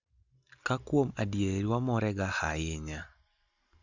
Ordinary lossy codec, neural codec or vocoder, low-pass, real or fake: Opus, 64 kbps; none; 7.2 kHz; real